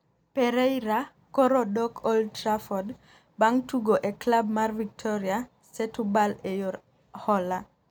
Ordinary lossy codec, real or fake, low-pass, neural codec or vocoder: none; real; none; none